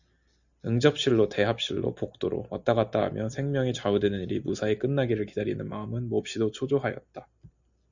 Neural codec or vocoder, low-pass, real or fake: none; 7.2 kHz; real